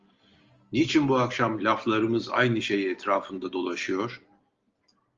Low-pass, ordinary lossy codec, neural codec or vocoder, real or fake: 7.2 kHz; Opus, 32 kbps; none; real